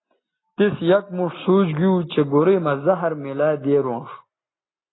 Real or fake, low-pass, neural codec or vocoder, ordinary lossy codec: real; 7.2 kHz; none; AAC, 16 kbps